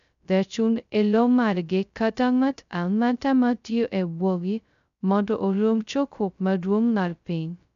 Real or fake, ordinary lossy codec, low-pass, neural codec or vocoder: fake; none; 7.2 kHz; codec, 16 kHz, 0.2 kbps, FocalCodec